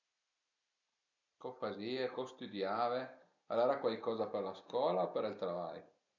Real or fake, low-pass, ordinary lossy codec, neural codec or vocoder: real; 7.2 kHz; none; none